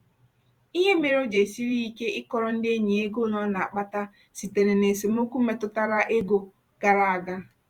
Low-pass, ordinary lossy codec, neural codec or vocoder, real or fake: 19.8 kHz; Opus, 64 kbps; vocoder, 44.1 kHz, 128 mel bands every 512 samples, BigVGAN v2; fake